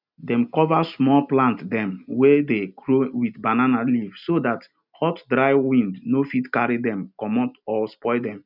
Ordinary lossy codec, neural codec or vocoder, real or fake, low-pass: none; none; real; 5.4 kHz